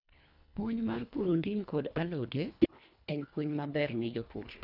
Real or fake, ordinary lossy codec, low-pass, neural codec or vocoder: fake; none; 5.4 kHz; codec, 24 kHz, 1.5 kbps, HILCodec